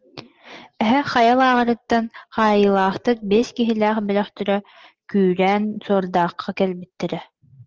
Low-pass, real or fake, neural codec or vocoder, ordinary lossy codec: 7.2 kHz; real; none; Opus, 16 kbps